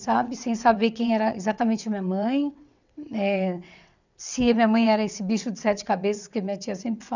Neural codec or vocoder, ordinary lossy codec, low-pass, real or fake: vocoder, 22.05 kHz, 80 mel bands, WaveNeXt; none; 7.2 kHz; fake